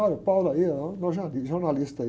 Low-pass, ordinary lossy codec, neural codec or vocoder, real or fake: none; none; none; real